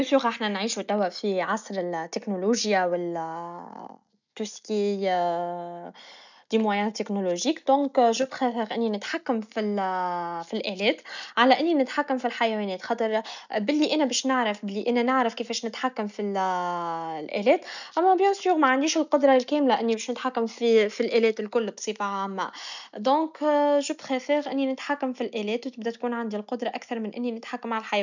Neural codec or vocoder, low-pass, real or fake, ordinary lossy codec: none; 7.2 kHz; real; none